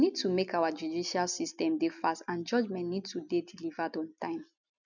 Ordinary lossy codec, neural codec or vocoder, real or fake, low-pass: none; none; real; 7.2 kHz